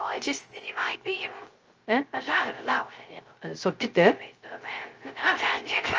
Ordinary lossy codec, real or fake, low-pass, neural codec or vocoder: Opus, 24 kbps; fake; 7.2 kHz; codec, 16 kHz, 0.3 kbps, FocalCodec